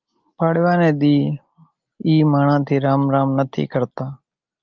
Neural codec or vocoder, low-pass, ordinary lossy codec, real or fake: none; 7.2 kHz; Opus, 24 kbps; real